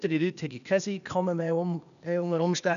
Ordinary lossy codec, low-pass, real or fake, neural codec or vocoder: none; 7.2 kHz; fake; codec, 16 kHz, 0.8 kbps, ZipCodec